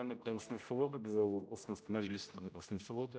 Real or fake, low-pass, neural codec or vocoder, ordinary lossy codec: fake; none; codec, 16 kHz, 0.5 kbps, X-Codec, HuBERT features, trained on general audio; none